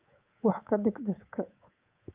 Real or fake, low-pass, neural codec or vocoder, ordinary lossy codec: fake; 3.6 kHz; codec, 24 kHz, 3.1 kbps, DualCodec; Opus, 32 kbps